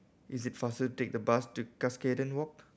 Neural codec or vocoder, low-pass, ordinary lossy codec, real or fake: none; none; none; real